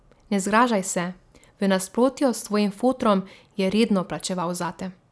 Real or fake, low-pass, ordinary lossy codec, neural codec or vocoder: real; none; none; none